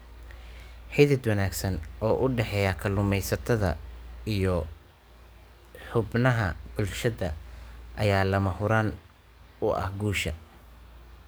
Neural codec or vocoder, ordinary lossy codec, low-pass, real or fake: codec, 44.1 kHz, 7.8 kbps, DAC; none; none; fake